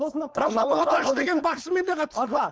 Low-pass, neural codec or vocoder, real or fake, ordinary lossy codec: none; codec, 16 kHz, 4.8 kbps, FACodec; fake; none